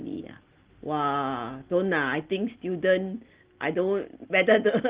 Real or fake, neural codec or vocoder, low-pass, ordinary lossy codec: real; none; 3.6 kHz; Opus, 16 kbps